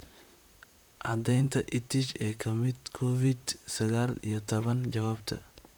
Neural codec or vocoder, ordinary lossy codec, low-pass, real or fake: vocoder, 44.1 kHz, 128 mel bands, Pupu-Vocoder; none; none; fake